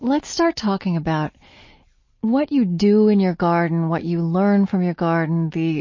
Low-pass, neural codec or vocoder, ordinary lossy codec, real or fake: 7.2 kHz; none; MP3, 32 kbps; real